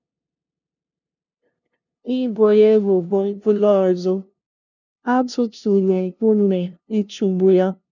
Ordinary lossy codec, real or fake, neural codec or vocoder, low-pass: none; fake; codec, 16 kHz, 0.5 kbps, FunCodec, trained on LibriTTS, 25 frames a second; 7.2 kHz